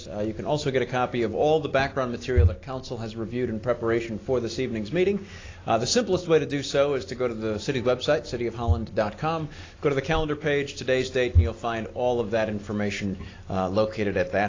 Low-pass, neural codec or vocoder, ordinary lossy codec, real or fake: 7.2 kHz; none; AAC, 32 kbps; real